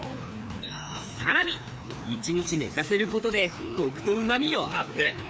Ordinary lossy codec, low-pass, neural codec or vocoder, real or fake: none; none; codec, 16 kHz, 2 kbps, FreqCodec, larger model; fake